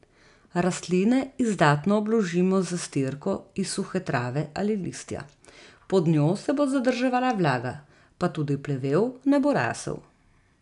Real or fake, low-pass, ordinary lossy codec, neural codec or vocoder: real; 10.8 kHz; none; none